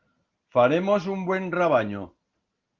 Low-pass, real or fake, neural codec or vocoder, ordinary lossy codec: 7.2 kHz; real; none; Opus, 16 kbps